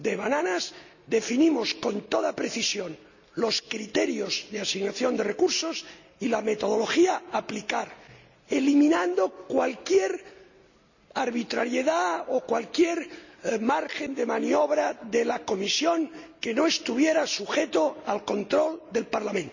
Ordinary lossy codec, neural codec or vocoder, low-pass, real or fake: none; none; 7.2 kHz; real